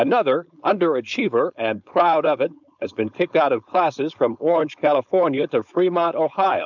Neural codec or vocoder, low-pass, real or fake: codec, 16 kHz, 4.8 kbps, FACodec; 7.2 kHz; fake